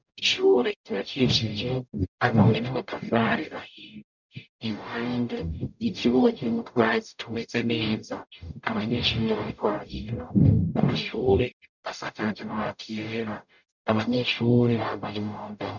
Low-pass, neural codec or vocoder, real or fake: 7.2 kHz; codec, 44.1 kHz, 0.9 kbps, DAC; fake